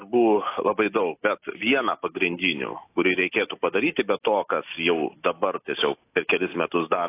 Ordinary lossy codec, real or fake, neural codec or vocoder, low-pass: AAC, 24 kbps; real; none; 3.6 kHz